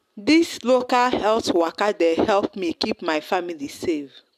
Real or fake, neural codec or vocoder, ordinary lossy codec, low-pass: real; none; AAC, 96 kbps; 14.4 kHz